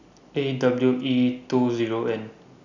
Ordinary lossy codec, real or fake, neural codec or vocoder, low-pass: none; real; none; 7.2 kHz